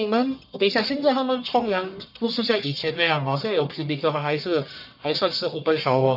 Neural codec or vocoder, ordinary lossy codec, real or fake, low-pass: codec, 44.1 kHz, 1.7 kbps, Pupu-Codec; none; fake; 5.4 kHz